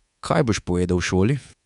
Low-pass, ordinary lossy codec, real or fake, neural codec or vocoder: 10.8 kHz; none; fake; codec, 24 kHz, 3.1 kbps, DualCodec